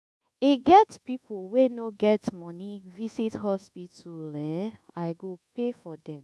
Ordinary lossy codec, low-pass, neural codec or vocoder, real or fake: none; none; codec, 24 kHz, 1.2 kbps, DualCodec; fake